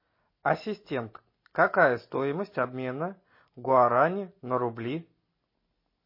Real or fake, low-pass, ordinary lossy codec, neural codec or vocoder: real; 5.4 kHz; MP3, 24 kbps; none